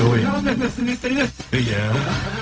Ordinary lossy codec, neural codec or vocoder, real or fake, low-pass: none; codec, 16 kHz, 0.4 kbps, LongCat-Audio-Codec; fake; none